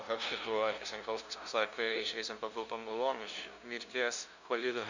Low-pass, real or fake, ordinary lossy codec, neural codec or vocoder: 7.2 kHz; fake; Opus, 64 kbps; codec, 16 kHz, 0.5 kbps, FunCodec, trained on LibriTTS, 25 frames a second